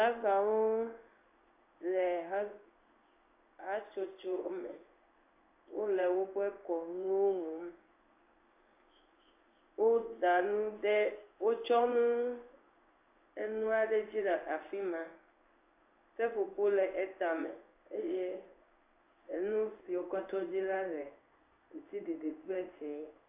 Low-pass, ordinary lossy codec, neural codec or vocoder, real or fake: 3.6 kHz; AAC, 32 kbps; codec, 16 kHz in and 24 kHz out, 1 kbps, XY-Tokenizer; fake